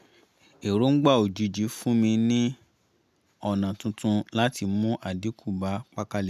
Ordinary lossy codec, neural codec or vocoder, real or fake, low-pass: none; none; real; 14.4 kHz